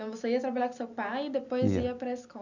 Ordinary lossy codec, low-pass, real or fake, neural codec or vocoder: none; 7.2 kHz; real; none